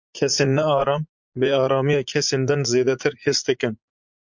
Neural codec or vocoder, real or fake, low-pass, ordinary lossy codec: vocoder, 44.1 kHz, 80 mel bands, Vocos; fake; 7.2 kHz; MP3, 64 kbps